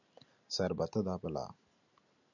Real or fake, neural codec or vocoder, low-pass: real; none; 7.2 kHz